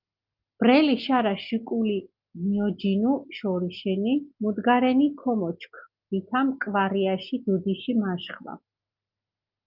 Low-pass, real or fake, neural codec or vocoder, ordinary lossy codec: 5.4 kHz; real; none; Opus, 24 kbps